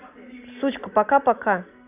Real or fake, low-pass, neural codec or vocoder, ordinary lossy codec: real; 3.6 kHz; none; none